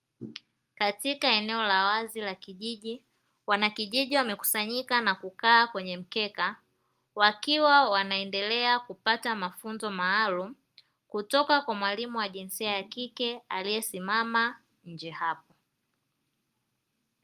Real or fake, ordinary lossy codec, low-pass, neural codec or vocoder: real; Opus, 32 kbps; 14.4 kHz; none